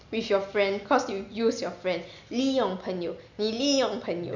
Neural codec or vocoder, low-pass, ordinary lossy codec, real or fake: none; 7.2 kHz; none; real